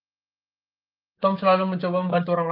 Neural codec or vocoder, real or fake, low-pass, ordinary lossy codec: codec, 16 kHz, 6 kbps, DAC; fake; 5.4 kHz; Opus, 24 kbps